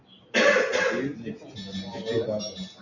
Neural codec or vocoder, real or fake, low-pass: none; real; 7.2 kHz